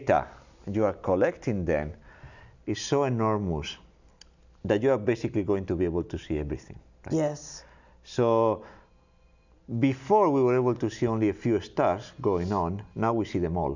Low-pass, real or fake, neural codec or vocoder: 7.2 kHz; real; none